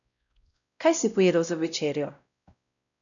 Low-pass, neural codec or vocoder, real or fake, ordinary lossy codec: 7.2 kHz; codec, 16 kHz, 0.5 kbps, X-Codec, WavLM features, trained on Multilingual LibriSpeech; fake; MP3, 96 kbps